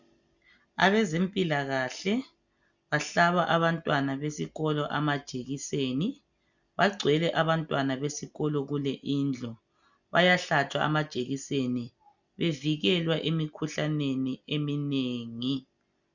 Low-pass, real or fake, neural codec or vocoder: 7.2 kHz; real; none